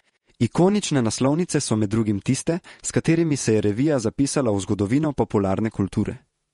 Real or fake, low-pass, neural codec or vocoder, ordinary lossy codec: fake; 19.8 kHz; vocoder, 48 kHz, 128 mel bands, Vocos; MP3, 48 kbps